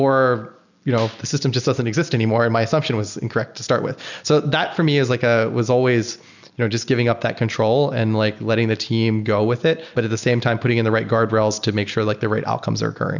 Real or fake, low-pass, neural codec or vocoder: real; 7.2 kHz; none